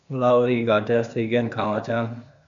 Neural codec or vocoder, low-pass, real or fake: codec, 16 kHz, 0.8 kbps, ZipCodec; 7.2 kHz; fake